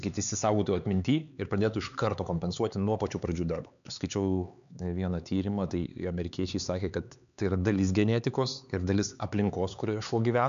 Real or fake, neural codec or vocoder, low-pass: fake; codec, 16 kHz, 4 kbps, X-Codec, HuBERT features, trained on LibriSpeech; 7.2 kHz